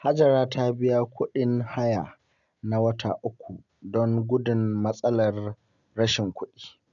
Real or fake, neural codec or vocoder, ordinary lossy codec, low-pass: real; none; none; 7.2 kHz